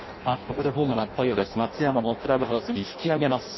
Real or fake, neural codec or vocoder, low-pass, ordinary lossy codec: fake; codec, 16 kHz in and 24 kHz out, 0.6 kbps, FireRedTTS-2 codec; 7.2 kHz; MP3, 24 kbps